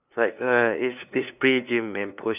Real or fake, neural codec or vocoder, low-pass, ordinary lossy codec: fake; codec, 16 kHz, 2 kbps, FunCodec, trained on LibriTTS, 25 frames a second; 3.6 kHz; none